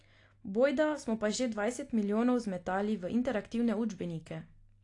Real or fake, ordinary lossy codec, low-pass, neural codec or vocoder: real; AAC, 48 kbps; 10.8 kHz; none